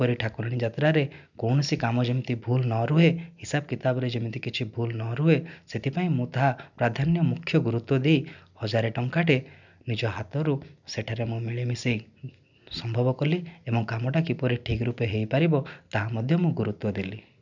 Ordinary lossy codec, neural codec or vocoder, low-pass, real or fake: none; none; 7.2 kHz; real